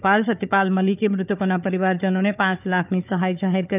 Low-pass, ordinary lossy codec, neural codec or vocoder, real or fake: 3.6 kHz; none; codec, 16 kHz, 4 kbps, FunCodec, trained on Chinese and English, 50 frames a second; fake